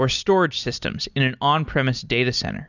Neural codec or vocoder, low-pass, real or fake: none; 7.2 kHz; real